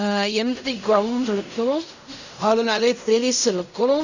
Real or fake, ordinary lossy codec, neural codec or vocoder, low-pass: fake; none; codec, 16 kHz in and 24 kHz out, 0.4 kbps, LongCat-Audio-Codec, fine tuned four codebook decoder; 7.2 kHz